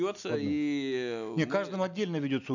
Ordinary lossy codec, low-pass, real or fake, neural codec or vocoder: none; 7.2 kHz; real; none